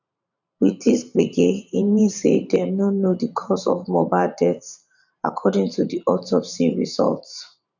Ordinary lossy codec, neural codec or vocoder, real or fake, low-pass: none; vocoder, 44.1 kHz, 128 mel bands every 512 samples, BigVGAN v2; fake; 7.2 kHz